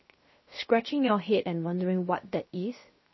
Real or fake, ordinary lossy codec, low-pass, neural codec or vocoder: fake; MP3, 24 kbps; 7.2 kHz; codec, 16 kHz, 0.7 kbps, FocalCodec